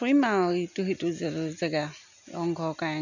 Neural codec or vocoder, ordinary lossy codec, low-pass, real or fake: none; none; 7.2 kHz; real